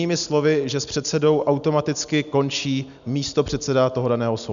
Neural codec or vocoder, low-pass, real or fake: none; 7.2 kHz; real